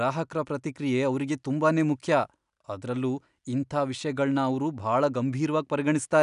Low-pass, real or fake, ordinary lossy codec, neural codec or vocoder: 10.8 kHz; real; none; none